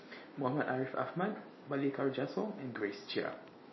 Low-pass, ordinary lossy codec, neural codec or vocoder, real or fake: 7.2 kHz; MP3, 24 kbps; none; real